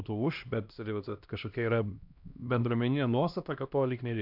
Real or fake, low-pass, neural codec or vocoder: fake; 5.4 kHz; codec, 16 kHz, 1 kbps, X-Codec, HuBERT features, trained on LibriSpeech